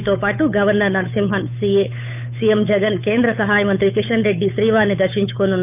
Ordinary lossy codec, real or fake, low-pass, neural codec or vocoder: none; fake; 3.6 kHz; codec, 16 kHz, 8 kbps, FunCodec, trained on Chinese and English, 25 frames a second